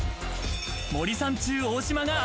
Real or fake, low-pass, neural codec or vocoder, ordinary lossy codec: real; none; none; none